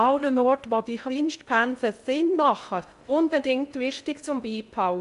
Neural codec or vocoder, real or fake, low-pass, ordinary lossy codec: codec, 16 kHz in and 24 kHz out, 0.6 kbps, FocalCodec, streaming, 2048 codes; fake; 10.8 kHz; none